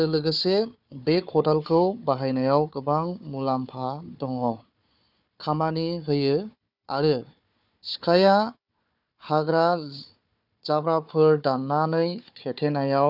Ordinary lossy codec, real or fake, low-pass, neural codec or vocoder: none; fake; 5.4 kHz; codec, 16 kHz, 4 kbps, FunCodec, trained on Chinese and English, 50 frames a second